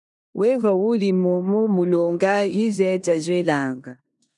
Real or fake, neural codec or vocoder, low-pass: fake; codec, 16 kHz in and 24 kHz out, 0.9 kbps, LongCat-Audio-Codec, four codebook decoder; 10.8 kHz